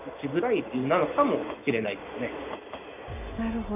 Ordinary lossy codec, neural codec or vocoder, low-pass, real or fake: none; none; 3.6 kHz; real